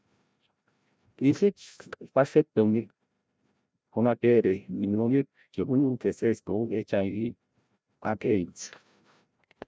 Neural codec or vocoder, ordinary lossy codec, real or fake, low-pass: codec, 16 kHz, 0.5 kbps, FreqCodec, larger model; none; fake; none